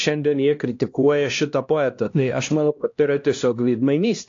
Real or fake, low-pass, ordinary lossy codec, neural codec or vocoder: fake; 7.2 kHz; AAC, 48 kbps; codec, 16 kHz, 1 kbps, X-Codec, HuBERT features, trained on LibriSpeech